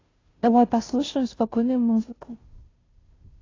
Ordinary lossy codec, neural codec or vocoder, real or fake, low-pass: AAC, 32 kbps; codec, 16 kHz, 0.5 kbps, FunCodec, trained on Chinese and English, 25 frames a second; fake; 7.2 kHz